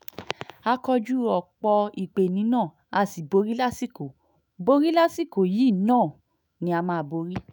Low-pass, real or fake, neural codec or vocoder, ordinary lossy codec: none; fake; autoencoder, 48 kHz, 128 numbers a frame, DAC-VAE, trained on Japanese speech; none